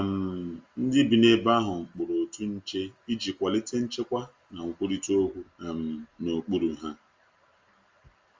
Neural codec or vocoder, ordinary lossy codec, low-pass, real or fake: none; Opus, 32 kbps; 7.2 kHz; real